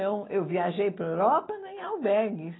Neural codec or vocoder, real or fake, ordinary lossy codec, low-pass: none; real; AAC, 16 kbps; 7.2 kHz